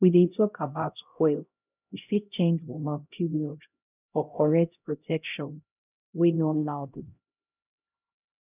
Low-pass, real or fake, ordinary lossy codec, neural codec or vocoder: 3.6 kHz; fake; none; codec, 16 kHz, 0.5 kbps, X-Codec, HuBERT features, trained on LibriSpeech